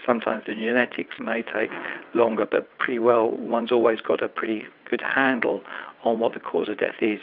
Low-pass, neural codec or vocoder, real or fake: 5.4 kHz; vocoder, 22.05 kHz, 80 mel bands, WaveNeXt; fake